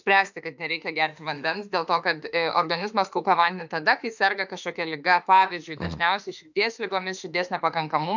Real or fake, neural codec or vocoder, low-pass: fake; autoencoder, 48 kHz, 32 numbers a frame, DAC-VAE, trained on Japanese speech; 7.2 kHz